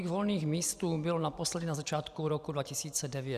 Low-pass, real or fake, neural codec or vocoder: 14.4 kHz; fake; vocoder, 44.1 kHz, 128 mel bands every 512 samples, BigVGAN v2